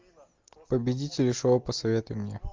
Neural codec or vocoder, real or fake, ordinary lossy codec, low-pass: none; real; Opus, 32 kbps; 7.2 kHz